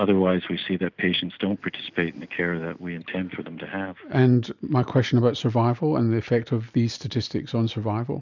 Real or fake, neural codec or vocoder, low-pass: real; none; 7.2 kHz